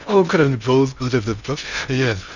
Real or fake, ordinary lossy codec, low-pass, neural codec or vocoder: fake; none; 7.2 kHz; codec, 16 kHz in and 24 kHz out, 0.6 kbps, FocalCodec, streaming, 2048 codes